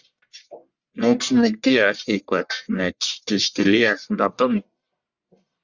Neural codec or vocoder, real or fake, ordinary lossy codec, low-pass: codec, 44.1 kHz, 1.7 kbps, Pupu-Codec; fake; Opus, 64 kbps; 7.2 kHz